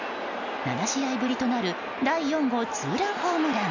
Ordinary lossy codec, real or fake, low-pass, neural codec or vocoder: none; real; 7.2 kHz; none